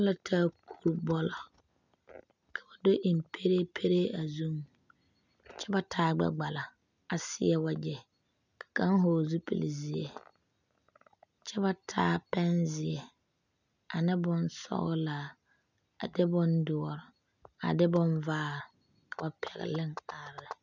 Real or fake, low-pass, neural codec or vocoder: real; 7.2 kHz; none